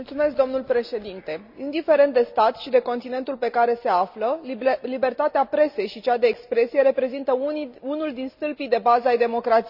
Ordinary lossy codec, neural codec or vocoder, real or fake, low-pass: none; none; real; 5.4 kHz